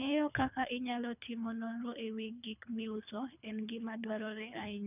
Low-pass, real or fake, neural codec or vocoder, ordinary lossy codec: 3.6 kHz; fake; codec, 24 kHz, 3 kbps, HILCodec; none